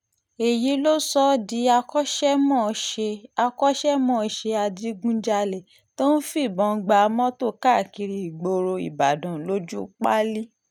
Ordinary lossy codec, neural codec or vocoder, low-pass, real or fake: none; none; 19.8 kHz; real